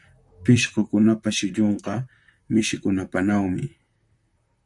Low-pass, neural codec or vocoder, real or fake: 10.8 kHz; vocoder, 44.1 kHz, 128 mel bands, Pupu-Vocoder; fake